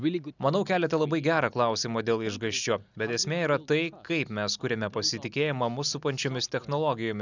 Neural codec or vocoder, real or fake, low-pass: none; real; 7.2 kHz